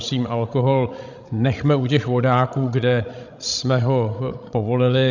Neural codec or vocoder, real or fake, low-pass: codec, 16 kHz, 16 kbps, FreqCodec, larger model; fake; 7.2 kHz